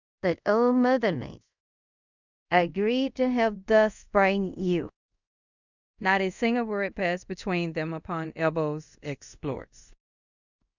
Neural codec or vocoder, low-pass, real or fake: codec, 24 kHz, 0.5 kbps, DualCodec; 7.2 kHz; fake